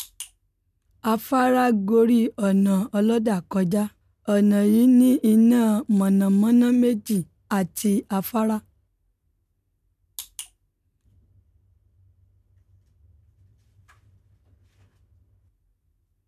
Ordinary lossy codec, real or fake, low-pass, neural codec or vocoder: none; real; 14.4 kHz; none